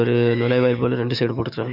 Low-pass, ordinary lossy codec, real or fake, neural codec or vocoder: 5.4 kHz; none; fake; autoencoder, 48 kHz, 128 numbers a frame, DAC-VAE, trained on Japanese speech